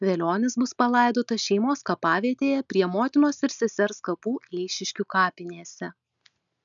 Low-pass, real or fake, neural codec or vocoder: 7.2 kHz; real; none